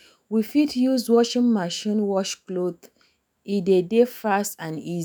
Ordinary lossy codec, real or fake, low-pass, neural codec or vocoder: none; fake; none; autoencoder, 48 kHz, 128 numbers a frame, DAC-VAE, trained on Japanese speech